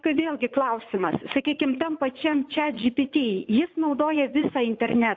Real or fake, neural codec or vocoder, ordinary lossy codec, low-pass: fake; vocoder, 44.1 kHz, 80 mel bands, Vocos; AAC, 48 kbps; 7.2 kHz